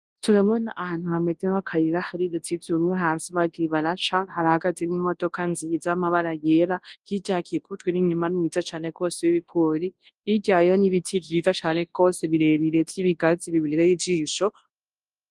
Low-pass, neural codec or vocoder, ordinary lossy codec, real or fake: 10.8 kHz; codec, 24 kHz, 0.9 kbps, WavTokenizer, large speech release; Opus, 24 kbps; fake